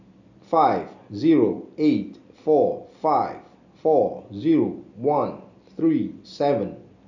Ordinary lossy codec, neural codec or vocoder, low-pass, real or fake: none; none; 7.2 kHz; real